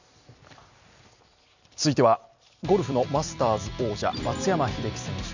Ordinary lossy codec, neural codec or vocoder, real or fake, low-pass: none; vocoder, 44.1 kHz, 128 mel bands every 256 samples, BigVGAN v2; fake; 7.2 kHz